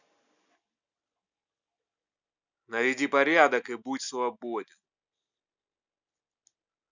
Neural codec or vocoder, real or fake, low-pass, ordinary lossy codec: none; real; 7.2 kHz; none